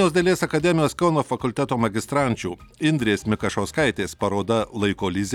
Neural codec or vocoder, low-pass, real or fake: none; 19.8 kHz; real